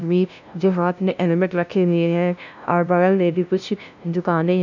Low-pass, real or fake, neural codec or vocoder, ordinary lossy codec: 7.2 kHz; fake; codec, 16 kHz, 0.5 kbps, FunCodec, trained on LibriTTS, 25 frames a second; none